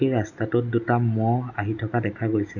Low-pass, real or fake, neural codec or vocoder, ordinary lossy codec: 7.2 kHz; real; none; none